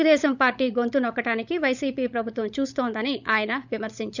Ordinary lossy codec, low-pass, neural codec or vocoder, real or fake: none; 7.2 kHz; codec, 16 kHz, 16 kbps, FunCodec, trained on LibriTTS, 50 frames a second; fake